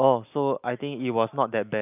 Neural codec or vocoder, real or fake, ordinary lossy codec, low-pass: none; real; none; 3.6 kHz